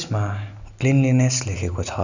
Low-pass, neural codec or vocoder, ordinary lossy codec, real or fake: 7.2 kHz; none; none; real